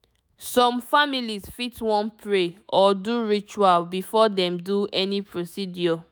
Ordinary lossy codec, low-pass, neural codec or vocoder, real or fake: none; none; autoencoder, 48 kHz, 128 numbers a frame, DAC-VAE, trained on Japanese speech; fake